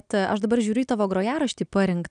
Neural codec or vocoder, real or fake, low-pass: none; real; 9.9 kHz